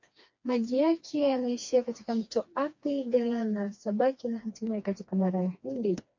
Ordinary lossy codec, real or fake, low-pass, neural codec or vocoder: MP3, 48 kbps; fake; 7.2 kHz; codec, 16 kHz, 2 kbps, FreqCodec, smaller model